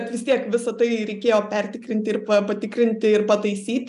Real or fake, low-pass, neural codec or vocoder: real; 10.8 kHz; none